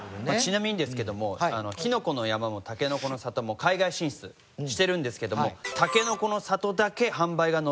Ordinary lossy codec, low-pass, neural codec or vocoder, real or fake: none; none; none; real